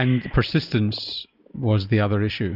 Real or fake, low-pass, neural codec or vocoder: real; 5.4 kHz; none